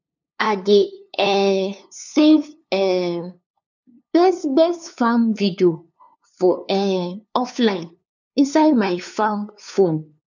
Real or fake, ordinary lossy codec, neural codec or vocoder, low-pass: fake; none; codec, 16 kHz, 2 kbps, FunCodec, trained on LibriTTS, 25 frames a second; 7.2 kHz